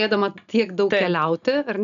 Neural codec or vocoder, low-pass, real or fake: none; 7.2 kHz; real